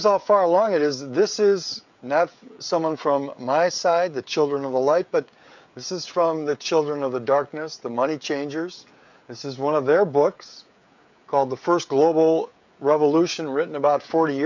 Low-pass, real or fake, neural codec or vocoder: 7.2 kHz; fake; codec, 16 kHz, 16 kbps, FreqCodec, smaller model